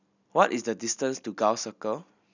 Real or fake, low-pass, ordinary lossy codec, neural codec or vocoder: real; 7.2 kHz; none; none